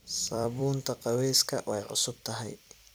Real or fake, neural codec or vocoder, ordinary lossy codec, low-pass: fake; vocoder, 44.1 kHz, 128 mel bands every 256 samples, BigVGAN v2; none; none